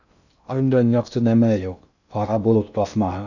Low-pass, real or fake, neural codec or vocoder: 7.2 kHz; fake; codec, 16 kHz in and 24 kHz out, 0.6 kbps, FocalCodec, streaming, 2048 codes